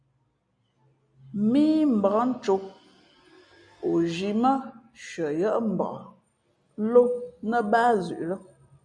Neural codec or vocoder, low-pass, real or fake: none; 9.9 kHz; real